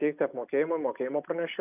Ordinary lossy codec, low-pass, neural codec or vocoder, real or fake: AAC, 32 kbps; 3.6 kHz; none; real